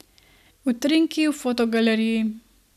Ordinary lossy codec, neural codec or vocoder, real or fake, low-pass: none; none; real; 14.4 kHz